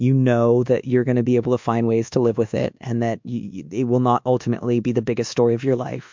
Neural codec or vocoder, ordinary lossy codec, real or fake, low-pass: codec, 24 kHz, 1.2 kbps, DualCodec; MP3, 64 kbps; fake; 7.2 kHz